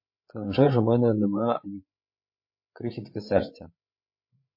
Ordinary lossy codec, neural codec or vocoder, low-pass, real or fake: MP3, 32 kbps; codec, 16 kHz, 8 kbps, FreqCodec, larger model; 5.4 kHz; fake